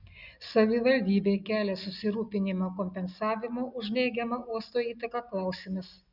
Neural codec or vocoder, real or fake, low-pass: none; real; 5.4 kHz